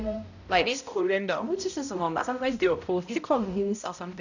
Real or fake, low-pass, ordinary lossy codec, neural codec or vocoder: fake; 7.2 kHz; none; codec, 16 kHz, 0.5 kbps, X-Codec, HuBERT features, trained on balanced general audio